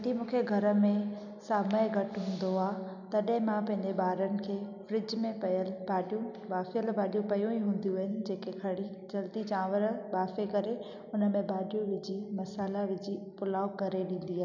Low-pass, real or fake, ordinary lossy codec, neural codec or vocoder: 7.2 kHz; real; none; none